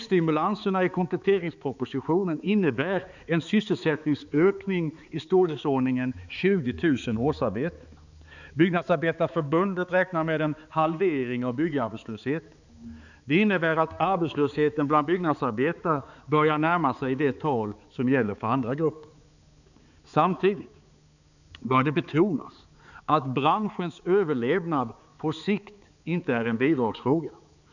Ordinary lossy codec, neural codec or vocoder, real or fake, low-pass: none; codec, 16 kHz, 4 kbps, X-Codec, HuBERT features, trained on balanced general audio; fake; 7.2 kHz